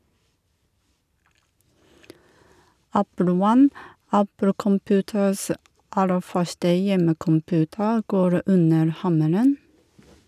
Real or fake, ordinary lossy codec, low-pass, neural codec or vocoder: real; none; 14.4 kHz; none